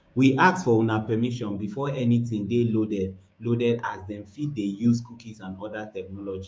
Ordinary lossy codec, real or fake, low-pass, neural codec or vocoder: none; fake; none; codec, 16 kHz, 6 kbps, DAC